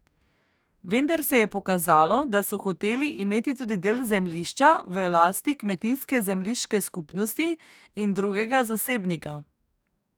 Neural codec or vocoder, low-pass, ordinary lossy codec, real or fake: codec, 44.1 kHz, 2.6 kbps, DAC; none; none; fake